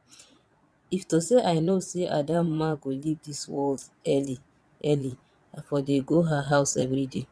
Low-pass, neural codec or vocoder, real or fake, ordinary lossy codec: none; vocoder, 22.05 kHz, 80 mel bands, Vocos; fake; none